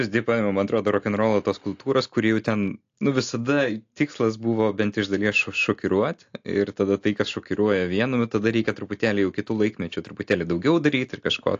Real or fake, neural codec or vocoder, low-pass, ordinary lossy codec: real; none; 7.2 kHz; AAC, 48 kbps